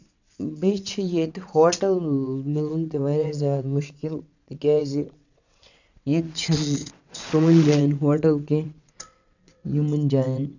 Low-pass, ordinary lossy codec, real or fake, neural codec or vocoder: 7.2 kHz; none; fake; vocoder, 22.05 kHz, 80 mel bands, WaveNeXt